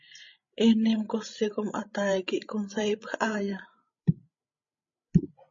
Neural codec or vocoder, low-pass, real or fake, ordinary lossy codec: codec, 16 kHz, 16 kbps, FreqCodec, larger model; 7.2 kHz; fake; MP3, 32 kbps